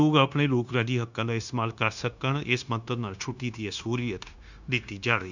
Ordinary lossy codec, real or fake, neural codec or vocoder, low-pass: none; fake; codec, 16 kHz, 0.9 kbps, LongCat-Audio-Codec; 7.2 kHz